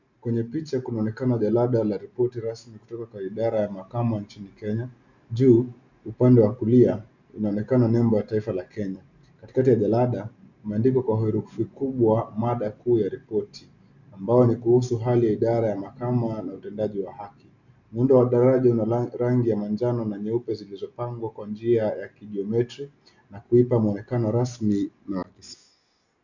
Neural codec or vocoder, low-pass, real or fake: none; 7.2 kHz; real